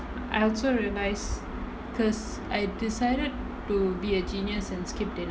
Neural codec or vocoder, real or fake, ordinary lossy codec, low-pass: none; real; none; none